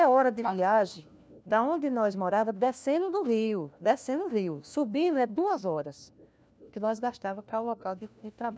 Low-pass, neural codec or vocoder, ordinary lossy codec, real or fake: none; codec, 16 kHz, 1 kbps, FunCodec, trained on LibriTTS, 50 frames a second; none; fake